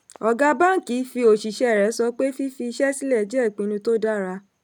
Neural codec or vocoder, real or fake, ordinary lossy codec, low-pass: none; real; none; 19.8 kHz